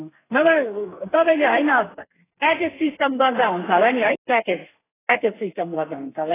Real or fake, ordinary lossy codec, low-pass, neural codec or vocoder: fake; AAC, 16 kbps; 3.6 kHz; codec, 32 kHz, 1.9 kbps, SNAC